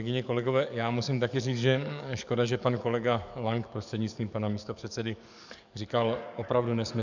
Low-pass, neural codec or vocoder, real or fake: 7.2 kHz; codec, 44.1 kHz, 7.8 kbps, DAC; fake